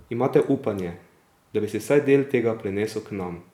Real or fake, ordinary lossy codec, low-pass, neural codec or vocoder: fake; none; 19.8 kHz; vocoder, 44.1 kHz, 128 mel bands every 256 samples, BigVGAN v2